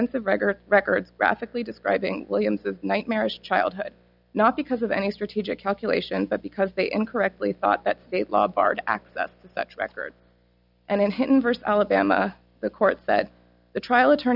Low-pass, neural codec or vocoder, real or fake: 5.4 kHz; none; real